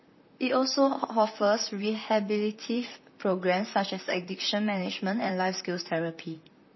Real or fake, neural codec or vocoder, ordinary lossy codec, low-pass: fake; vocoder, 44.1 kHz, 128 mel bands, Pupu-Vocoder; MP3, 24 kbps; 7.2 kHz